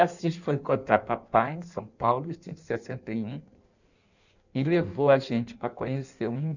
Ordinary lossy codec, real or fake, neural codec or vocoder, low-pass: none; fake; codec, 16 kHz in and 24 kHz out, 1.1 kbps, FireRedTTS-2 codec; 7.2 kHz